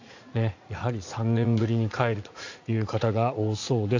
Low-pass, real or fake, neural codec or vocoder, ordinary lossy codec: 7.2 kHz; fake; vocoder, 44.1 kHz, 80 mel bands, Vocos; AAC, 48 kbps